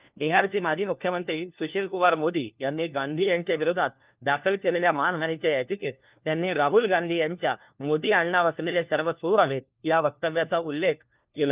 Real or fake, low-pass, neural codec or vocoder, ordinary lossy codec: fake; 3.6 kHz; codec, 16 kHz, 1 kbps, FunCodec, trained on LibriTTS, 50 frames a second; Opus, 32 kbps